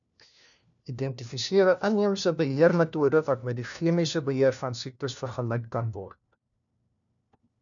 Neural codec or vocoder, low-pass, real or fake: codec, 16 kHz, 1 kbps, FunCodec, trained on LibriTTS, 50 frames a second; 7.2 kHz; fake